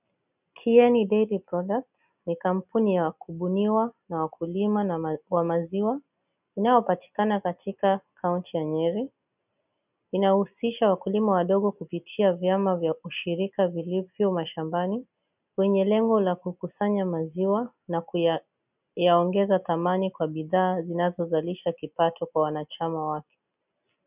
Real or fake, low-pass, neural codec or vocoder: real; 3.6 kHz; none